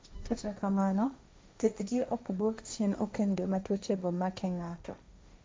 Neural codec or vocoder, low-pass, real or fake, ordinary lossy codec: codec, 16 kHz, 1.1 kbps, Voila-Tokenizer; none; fake; none